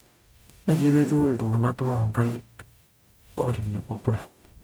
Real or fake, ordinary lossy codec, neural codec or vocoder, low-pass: fake; none; codec, 44.1 kHz, 0.9 kbps, DAC; none